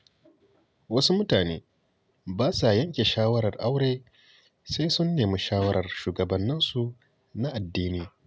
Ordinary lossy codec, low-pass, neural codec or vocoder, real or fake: none; none; none; real